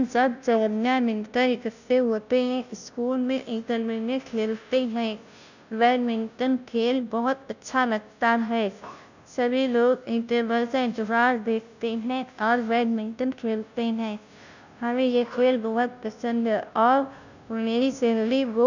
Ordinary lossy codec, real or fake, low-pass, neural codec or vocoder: none; fake; 7.2 kHz; codec, 16 kHz, 0.5 kbps, FunCodec, trained on Chinese and English, 25 frames a second